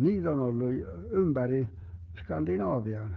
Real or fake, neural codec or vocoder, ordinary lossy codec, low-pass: fake; codec, 16 kHz, 8 kbps, FreqCodec, smaller model; Opus, 32 kbps; 7.2 kHz